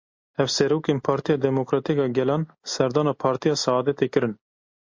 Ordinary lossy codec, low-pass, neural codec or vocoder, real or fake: MP3, 48 kbps; 7.2 kHz; none; real